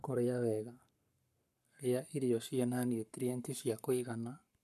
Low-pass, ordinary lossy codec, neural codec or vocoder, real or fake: 14.4 kHz; none; codec, 44.1 kHz, 7.8 kbps, Pupu-Codec; fake